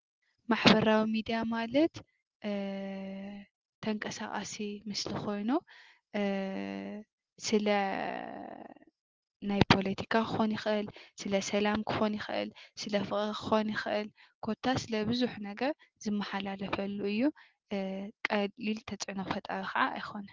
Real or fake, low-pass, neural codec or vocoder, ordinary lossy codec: real; 7.2 kHz; none; Opus, 16 kbps